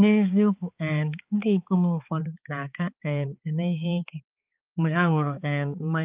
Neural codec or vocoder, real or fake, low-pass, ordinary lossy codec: codec, 16 kHz, 4 kbps, X-Codec, HuBERT features, trained on balanced general audio; fake; 3.6 kHz; Opus, 32 kbps